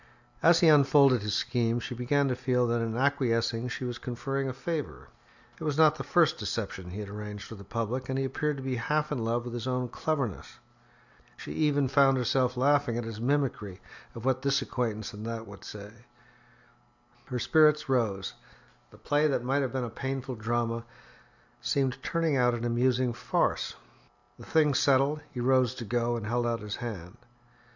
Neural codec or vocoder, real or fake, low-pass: none; real; 7.2 kHz